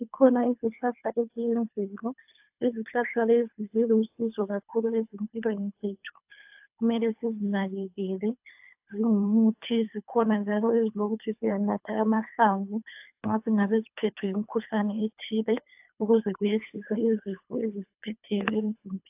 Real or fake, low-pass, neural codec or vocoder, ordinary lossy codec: fake; 3.6 kHz; codec, 24 kHz, 3 kbps, HILCodec; AAC, 32 kbps